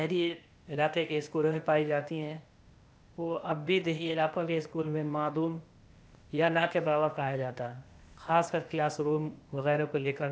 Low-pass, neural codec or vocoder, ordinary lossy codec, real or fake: none; codec, 16 kHz, 0.8 kbps, ZipCodec; none; fake